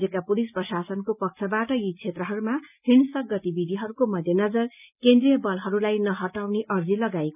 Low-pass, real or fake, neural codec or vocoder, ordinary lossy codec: 3.6 kHz; real; none; none